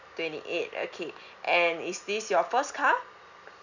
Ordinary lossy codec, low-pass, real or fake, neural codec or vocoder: none; 7.2 kHz; real; none